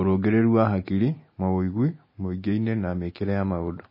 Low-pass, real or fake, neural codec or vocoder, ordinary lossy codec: 5.4 kHz; real; none; MP3, 24 kbps